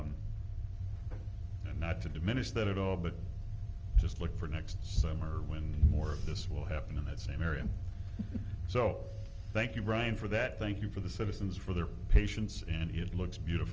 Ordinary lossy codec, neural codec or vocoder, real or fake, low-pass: Opus, 24 kbps; none; real; 7.2 kHz